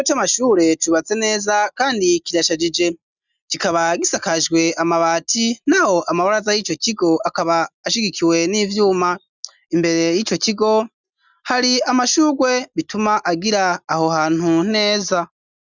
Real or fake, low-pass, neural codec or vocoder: real; 7.2 kHz; none